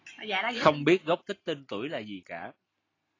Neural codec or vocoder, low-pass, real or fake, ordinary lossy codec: none; 7.2 kHz; real; AAC, 32 kbps